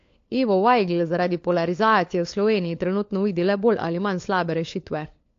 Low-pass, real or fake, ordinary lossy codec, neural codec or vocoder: 7.2 kHz; fake; AAC, 48 kbps; codec, 16 kHz, 4 kbps, FunCodec, trained on LibriTTS, 50 frames a second